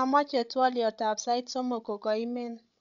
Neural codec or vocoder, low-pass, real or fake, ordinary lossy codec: codec, 16 kHz, 16 kbps, FreqCodec, larger model; 7.2 kHz; fake; none